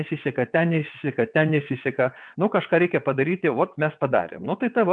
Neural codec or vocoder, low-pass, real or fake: vocoder, 22.05 kHz, 80 mel bands, WaveNeXt; 9.9 kHz; fake